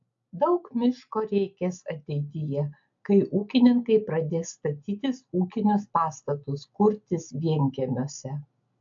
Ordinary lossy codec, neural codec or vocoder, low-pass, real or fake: AAC, 64 kbps; none; 7.2 kHz; real